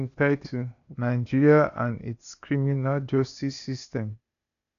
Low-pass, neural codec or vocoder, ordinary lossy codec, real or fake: 7.2 kHz; codec, 16 kHz, 0.8 kbps, ZipCodec; none; fake